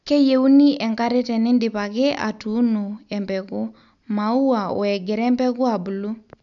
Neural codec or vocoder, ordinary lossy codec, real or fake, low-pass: none; none; real; 7.2 kHz